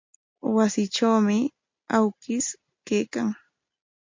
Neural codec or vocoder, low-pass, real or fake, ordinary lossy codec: none; 7.2 kHz; real; MP3, 48 kbps